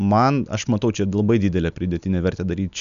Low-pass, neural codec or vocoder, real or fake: 7.2 kHz; none; real